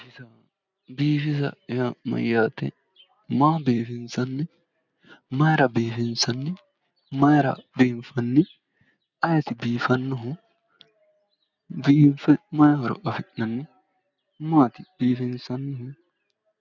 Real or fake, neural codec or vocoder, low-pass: real; none; 7.2 kHz